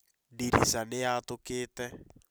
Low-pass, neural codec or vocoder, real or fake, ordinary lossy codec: none; vocoder, 44.1 kHz, 128 mel bands every 256 samples, BigVGAN v2; fake; none